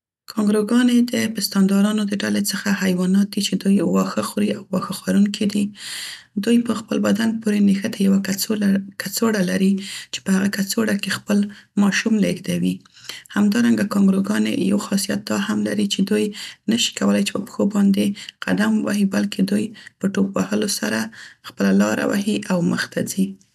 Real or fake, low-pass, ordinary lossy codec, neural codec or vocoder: real; 14.4 kHz; none; none